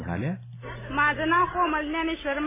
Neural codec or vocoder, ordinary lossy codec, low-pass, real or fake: none; MP3, 16 kbps; 3.6 kHz; real